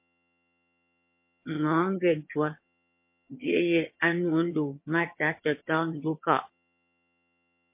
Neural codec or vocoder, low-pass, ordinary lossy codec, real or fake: vocoder, 22.05 kHz, 80 mel bands, HiFi-GAN; 3.6 kHz; MP3, 24 kbps; fake